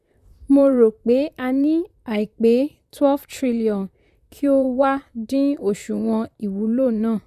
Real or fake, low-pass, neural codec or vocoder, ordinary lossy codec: fake; 14.4 kHz; vocoder, 44.1 kHz, 128 mel bands, Pupu-Vocoder; none